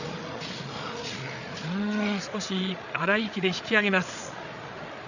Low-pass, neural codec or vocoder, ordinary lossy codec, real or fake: 7.2 kHz; codec, 16 kHz, 8 kbps, FreqCodec, larger model; none; fake